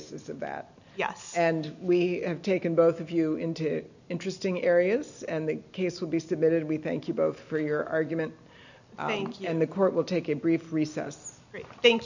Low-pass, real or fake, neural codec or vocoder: 7.2 kHz; real; none